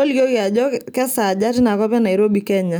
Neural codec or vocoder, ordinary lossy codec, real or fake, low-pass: none; none; real; none